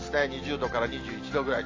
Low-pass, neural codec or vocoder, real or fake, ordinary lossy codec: 7.2 kHz; none; real; AAC, 32 kbps